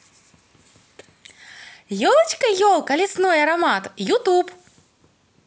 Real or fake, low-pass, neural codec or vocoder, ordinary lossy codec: real; none; none; none